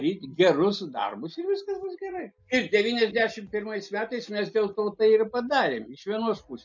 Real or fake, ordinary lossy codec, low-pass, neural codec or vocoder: real; MP3, 48 kbps; 7.2 kHz; none